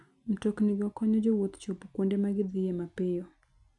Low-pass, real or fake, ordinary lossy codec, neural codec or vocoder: 10.8 kHz; real; none; none